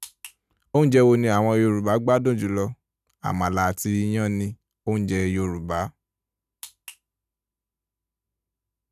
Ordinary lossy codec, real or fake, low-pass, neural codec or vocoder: none; real; 14.4 kHz; none